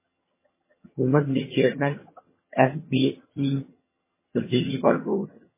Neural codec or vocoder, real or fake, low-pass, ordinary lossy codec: vocoder, 22.05 kHz, 80 mel bands, HiFi-GAN; fake; 3.6 kHz; MP3, 16 kbps